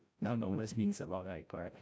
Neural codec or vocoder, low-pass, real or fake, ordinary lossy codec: codec, 16 kHz, 0.5 kbps, FreqCodec, larger model; none; fake; none